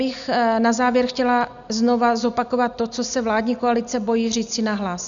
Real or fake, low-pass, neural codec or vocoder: real; 7.2 kHz; none